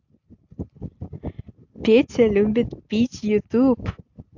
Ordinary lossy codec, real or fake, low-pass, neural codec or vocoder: AAC, 48 kbps; real; 7.2 kHz; none